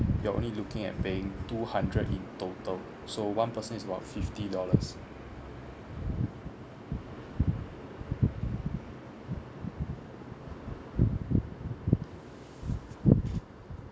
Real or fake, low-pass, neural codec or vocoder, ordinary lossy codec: real; none; none; none